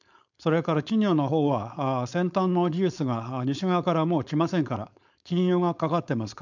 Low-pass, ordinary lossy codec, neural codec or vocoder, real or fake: 7.2 kHz; none; codec, 16 kHz, 4.8 kbps, FACodec; fake